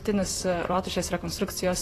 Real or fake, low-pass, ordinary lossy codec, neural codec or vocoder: fake; 14.4 kHz; AAC, 48 kbps; vocoder, 44.1 kHz, 128 mel bands, Pupu-Vocoder